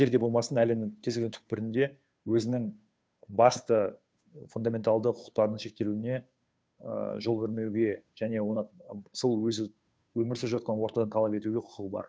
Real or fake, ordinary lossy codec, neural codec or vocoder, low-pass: fake; none; codec, 16 kHz, 2 kbps, FunCodec, trained on Chinese and English, 25 frames a second; none